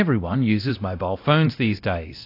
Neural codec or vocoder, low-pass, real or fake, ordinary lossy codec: codec, 24 kHz, 0.9 kbps, DualCodec; 5.4 kHz; fake; AAC, 32 kbps